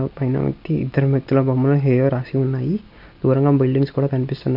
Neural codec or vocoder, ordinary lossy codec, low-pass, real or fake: none; none; 5.4 kHz; real